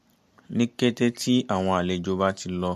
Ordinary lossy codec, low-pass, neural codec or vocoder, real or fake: MP3, 64 kbps; 14.4 kHz; none; real